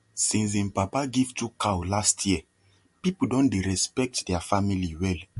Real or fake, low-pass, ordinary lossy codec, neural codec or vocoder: real; 14.4 kHz; MP3, 48 kbps; none